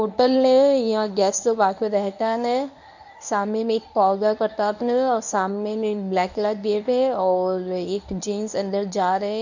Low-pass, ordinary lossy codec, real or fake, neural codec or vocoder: 7.2 kHz; none; fake; codec, 24 kHz, 0.9 kbps, WavTokenizer, medium speech release version 1